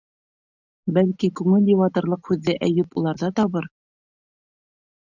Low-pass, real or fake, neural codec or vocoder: 7.2 kHz; real; none